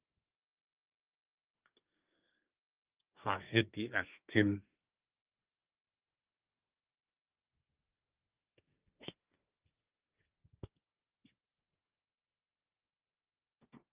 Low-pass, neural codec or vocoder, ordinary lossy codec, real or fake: 3.6 kHz; codec, 24 kHz, 1 kbps, SNAC; Opus, 32 kbps; fake